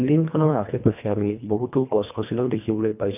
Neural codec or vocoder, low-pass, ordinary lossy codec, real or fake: codec, 24 kHz, 1.5 kbps, HILCodec; 3.6 kHz; none; fake